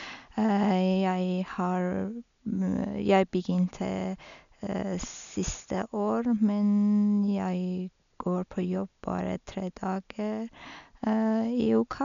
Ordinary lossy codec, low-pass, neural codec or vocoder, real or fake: none; 7.2 kHz; none; real